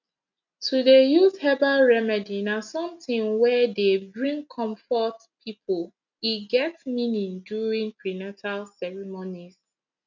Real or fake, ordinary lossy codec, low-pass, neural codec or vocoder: real; none; 7.2 kHz; none